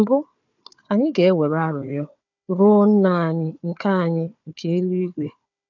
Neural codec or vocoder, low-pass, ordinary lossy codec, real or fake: codec, 16 kHz, 4 kbps, FunCodec, trained on Chinese and English, 50 frames a second; 7.2 kHz; none; fake